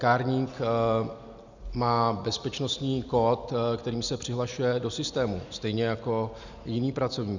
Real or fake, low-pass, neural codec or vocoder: real; 7.2 kHz; none